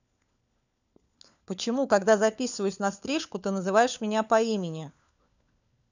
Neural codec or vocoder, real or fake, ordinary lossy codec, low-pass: codec, 16 kHz, 4 kbps, FunCodec, trained on LibriTTS, 50 frames a second; fake; none; 7.2 kHz